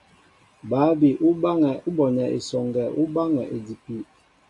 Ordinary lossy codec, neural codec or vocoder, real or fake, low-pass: AAC, 64 kbps; none; real; 10.8 kHz